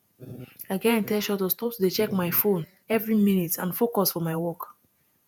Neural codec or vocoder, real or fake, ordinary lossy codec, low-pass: vocoder, 48 kHz, 128 mel bands, Vocos; fake; none; none